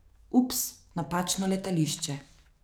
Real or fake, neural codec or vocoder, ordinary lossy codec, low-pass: fake; codec, 44.1 kHz, 7.8 kbps, DAC; none; none